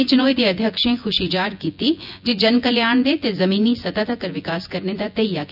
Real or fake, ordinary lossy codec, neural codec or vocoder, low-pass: fake; none; vocoder, 24 kHz, 100 mel bands, Vocos; 5.4 kHz